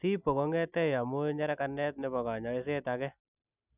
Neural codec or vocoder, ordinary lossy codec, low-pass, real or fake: none; none; 3.6 kHz; real